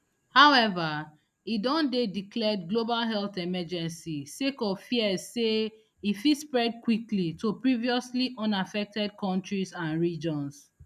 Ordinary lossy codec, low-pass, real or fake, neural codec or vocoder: none; 14.4 kHz; real; none